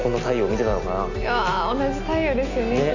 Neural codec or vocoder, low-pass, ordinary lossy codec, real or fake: none; 7.2 kHz; none; real